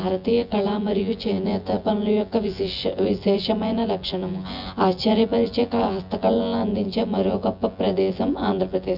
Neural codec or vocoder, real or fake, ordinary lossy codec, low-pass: vocoder, 24 kHz, 100 mel bands, Vocos; fake; none; 5.4 kHz